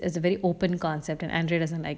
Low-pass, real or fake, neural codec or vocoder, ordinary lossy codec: none; real; none; none